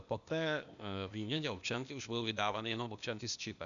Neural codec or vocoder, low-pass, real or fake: codec, 16 kHz, 0.8 kbps, ZipCodec; 7.2 kHz; fake